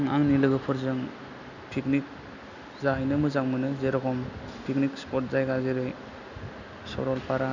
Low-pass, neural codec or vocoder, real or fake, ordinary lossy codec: 7.2 kHz; none; real; none